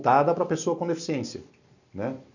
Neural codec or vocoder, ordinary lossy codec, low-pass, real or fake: none; none; 7.2 kHz; real